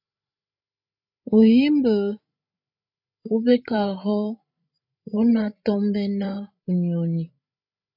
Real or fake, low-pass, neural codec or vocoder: fake; 5.4 kHz; codec, 16 kHz, 16 kbps, FreqCodec, larger model